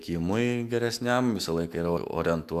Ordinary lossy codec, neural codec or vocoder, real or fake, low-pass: Opus, 64 kbps; autoencoder, 48 kHz, 128 numbers a frame, DAC-VAE, trained on Japanese speech; fake; 14.4 kHz